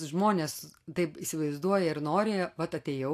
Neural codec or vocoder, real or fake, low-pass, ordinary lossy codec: none; real; 14.4 kHz; AAC, 64 kbps